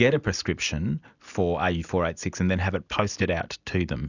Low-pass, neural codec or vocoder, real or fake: 7.2 kHz; none; real